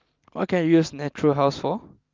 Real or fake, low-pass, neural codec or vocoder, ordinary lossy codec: real; 7.2 kHz; none; Opus, 24 kbps